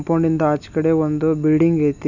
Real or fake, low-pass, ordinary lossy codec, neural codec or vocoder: real; 7.2 kHz; none; none